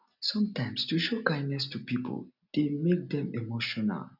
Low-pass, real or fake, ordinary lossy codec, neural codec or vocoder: 5.4 kHz; real; none; none